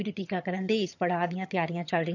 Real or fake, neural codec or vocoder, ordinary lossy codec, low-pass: fake; vocoder, 22.05 kHz, 80 mel bands, HiFi-GAN; none; 7.2 kHz